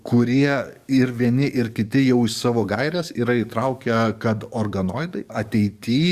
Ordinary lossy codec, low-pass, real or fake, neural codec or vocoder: Opus, 64 kbps; 14.4 kHz; fake; codec, 44.1 kHz, 7.8 kbps, DAC